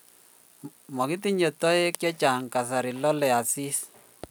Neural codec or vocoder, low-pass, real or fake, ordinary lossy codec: none; none; real; none